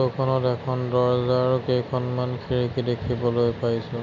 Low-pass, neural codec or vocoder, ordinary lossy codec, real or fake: 7.2 kHz; none; none; real